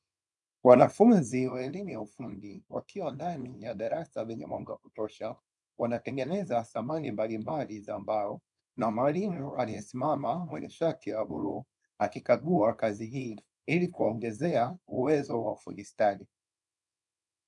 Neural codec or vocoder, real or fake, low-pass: codec, 24 kHz, 0.9 kbps, WavTokenizer, small release; fake; 10.8 kHz